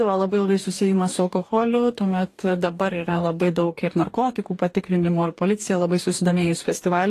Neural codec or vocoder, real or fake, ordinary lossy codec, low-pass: codec, 44.1 kHz, 2.6 kbps, DAC; fake; AAC, 48 kbps; 14.4 kHz